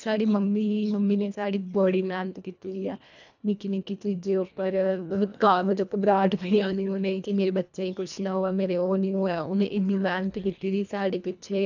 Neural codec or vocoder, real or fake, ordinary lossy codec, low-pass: codec, 24 kHz, 1.5 kbps, HILCodec; fake; none; 7.2 kHz